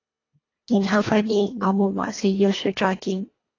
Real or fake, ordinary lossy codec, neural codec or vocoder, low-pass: fake; AAC, 32 kbps; codec, 24 kHz, 1.5 kbps, HILCodec; 7.2 kHz